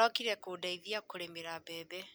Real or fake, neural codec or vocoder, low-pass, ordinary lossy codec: real; none; none; none